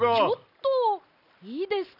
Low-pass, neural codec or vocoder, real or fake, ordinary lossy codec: 5.4 kHz; none; real; none